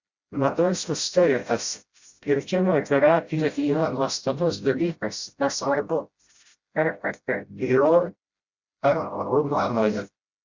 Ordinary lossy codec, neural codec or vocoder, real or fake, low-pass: Opus, 64 kbps; codec, 16 kHz, 0.5 kbps, FreqCodec, smaller model; fake; 7.2 kHz